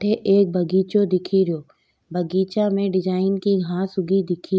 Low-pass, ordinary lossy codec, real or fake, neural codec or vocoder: none; none; real; none